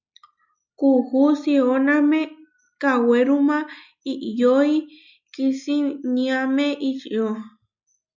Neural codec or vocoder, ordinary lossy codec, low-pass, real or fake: none; MP3, 64 kbps; 7.2 kHz; real